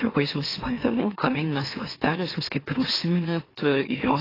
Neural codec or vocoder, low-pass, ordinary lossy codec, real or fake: autoencoder, 44.1 kHz, a latent of 192 numbers a frame, MeloTTS; 5.4 kHz; AAC, 24 kbps; fake